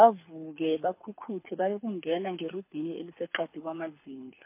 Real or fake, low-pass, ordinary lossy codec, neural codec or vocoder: fake; 3.6 kHz; MP3, 24 kbps; codec, 24 kHz, 6 kbps, HILCodec